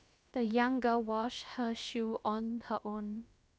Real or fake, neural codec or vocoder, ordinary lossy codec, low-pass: fake; codec, 16 kHz, about 1 kbps, DyCAST, with the encoder's durations; none; none